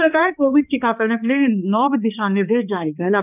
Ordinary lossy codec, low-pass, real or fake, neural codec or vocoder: none; 3.6 kHz; fake; codec, 16 kHz, 2 kbps, X-Codec, HuBERT features, trained on balanced general audio